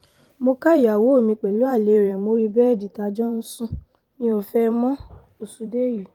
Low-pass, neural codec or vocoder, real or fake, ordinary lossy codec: 19.8 kHz; vocoder, 44.1 kHz, 128 mel bands every 512 samples, BigVGAN v2; fake; Opus, 32 kbps